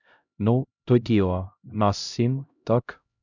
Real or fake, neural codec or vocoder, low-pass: fake; codec, 16 kHz, 0.5 kbps, X-Codec, HuBERT features, trained on LibriSpeech; 7.2 kHz